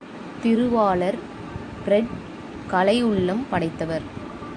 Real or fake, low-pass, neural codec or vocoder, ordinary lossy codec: real; 9.9 kHz; none; AAC, 64 kbps